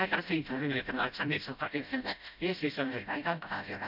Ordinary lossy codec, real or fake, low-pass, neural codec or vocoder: none; fake; 5.4 kHz; codec, 16 kHz, 0.5 kbps, FreqCodec, smaller model